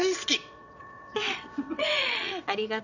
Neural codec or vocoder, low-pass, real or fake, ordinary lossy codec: vocoder, 22.05 kHz, 80 mel bands, WaveNeXt; 7.2 kHz; fake; none